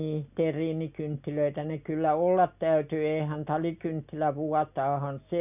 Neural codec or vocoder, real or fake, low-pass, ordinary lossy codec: none; real; 3.6 kHz; none